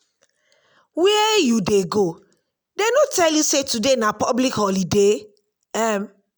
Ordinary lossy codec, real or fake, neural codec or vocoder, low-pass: none; real; none; none